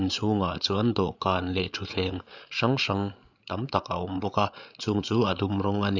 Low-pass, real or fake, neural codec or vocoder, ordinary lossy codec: 7.2 kHz; fake; codec, 16 kHz, 8 kbps, FreqCodec, larger model; none